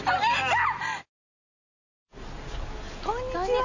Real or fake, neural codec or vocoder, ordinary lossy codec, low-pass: real; none; none; 7.2 kHz